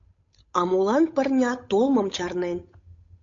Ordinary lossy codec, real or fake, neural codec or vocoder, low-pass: MP3, 48 kbps; fake; codec, 16 kHz, 8 kbps, FunCodec, trained on Chinese and English, 25 frames a second; 7.2 kHz